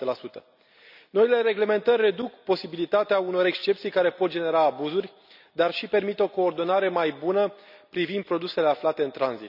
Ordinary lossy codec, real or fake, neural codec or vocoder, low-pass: none; real; none; 5.4 kHz